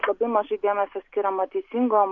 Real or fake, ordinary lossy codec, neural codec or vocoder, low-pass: real; MP3, 48 kbps; none; 7.2 kHz